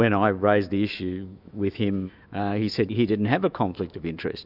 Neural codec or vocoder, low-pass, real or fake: autoencoder, 48 kHz, 128 numbers a frame, DAC-VAE, trained on Japanese speech; 5.4 kHz; fake